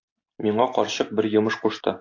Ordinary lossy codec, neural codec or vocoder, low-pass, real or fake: AAC, 48 kbps; none; 7.2 kHz; real